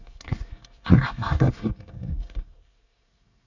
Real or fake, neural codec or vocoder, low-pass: fake; codec, 24 kHz, 1 kbps, SNAC; 7.2 kHz